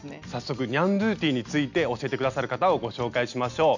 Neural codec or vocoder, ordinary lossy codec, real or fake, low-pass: none; none; real; 7.2 kHz